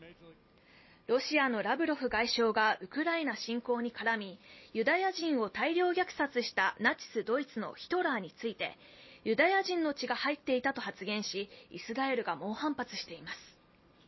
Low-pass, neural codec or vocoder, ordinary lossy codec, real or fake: 7.2 kHz; none; MP3, 24 kbps; real